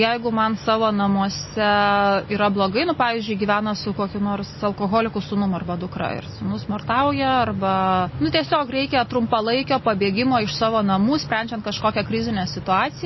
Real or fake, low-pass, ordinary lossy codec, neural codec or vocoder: real; 7.2 kHz; MP3, 24 kbps; none